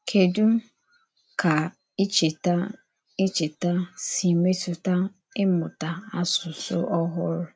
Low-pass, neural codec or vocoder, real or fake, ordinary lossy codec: none; none; real; none